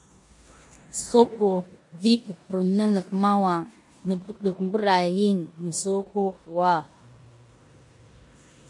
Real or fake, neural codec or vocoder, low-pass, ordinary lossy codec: fake; codec, 16 kHz in and 24 kHz out, 0.9 kbps, LongCat-Audio-Codec, four codebook decoder; 10.8 kHz; MP3, 48 kbps